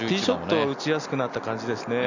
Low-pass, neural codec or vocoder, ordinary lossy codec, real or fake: 7.2 kHz; none; none; real